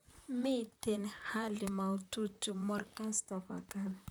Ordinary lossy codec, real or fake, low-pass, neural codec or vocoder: none; fake; none; vocoder, 44.1 kHz, 128 mel bands, Pupu-Vocoder